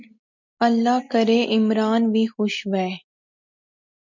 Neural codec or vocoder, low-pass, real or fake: none; 7.2 kHz; real